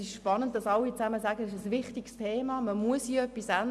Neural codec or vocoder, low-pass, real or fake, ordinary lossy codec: none; none; real; none